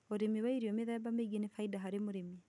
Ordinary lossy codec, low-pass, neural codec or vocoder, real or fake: none; 10.8 kHz; none; real